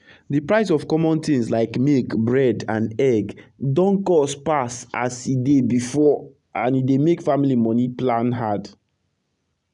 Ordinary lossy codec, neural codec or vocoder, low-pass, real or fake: none; none; 10.8 kHz; real